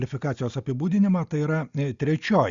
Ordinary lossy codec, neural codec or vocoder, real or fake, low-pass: Opus, 64 kbps; none; real; 7.2 kHz